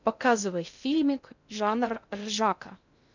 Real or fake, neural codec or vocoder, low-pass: fake; codec, 16 kHz in and 24 kHz out, 0.6 kbps, FocalCodec, streaming, 2048 codes; 7.2 kHz